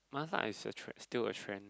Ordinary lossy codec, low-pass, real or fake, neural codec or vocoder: none; none; real; none